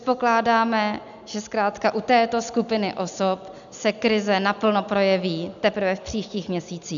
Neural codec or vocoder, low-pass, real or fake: none; 7.2 kHz; real